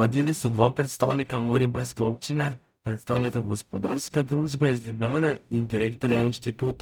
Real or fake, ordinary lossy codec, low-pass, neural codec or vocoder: fake; none; none; codec, 44.1 kHz, 0.9 kbps, DAC